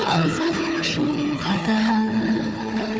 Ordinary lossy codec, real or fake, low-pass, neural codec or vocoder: none; fake; none; codec, 16 kHz, 4 kbps, FunCodec, trained on Chinese and English, 50 frames a second